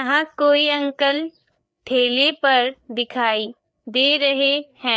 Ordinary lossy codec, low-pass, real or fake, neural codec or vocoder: none; none; fake; codec, 16 kHz, 4 kbps, FreqCodec, larger model